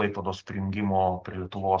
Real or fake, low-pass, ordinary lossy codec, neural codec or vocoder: real; 7.2 kHz; Opus, 16 kbps; none